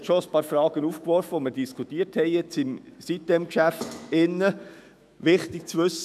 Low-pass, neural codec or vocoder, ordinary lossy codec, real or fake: 14.4 kHz; autoencoder, 48 kHz, 128 numbers a frame, DAC-VAE, trained on Japanese speech; none; fake